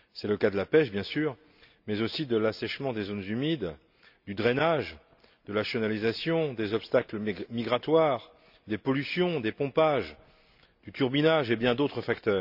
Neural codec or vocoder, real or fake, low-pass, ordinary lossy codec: none; real; 5.4 kHz; none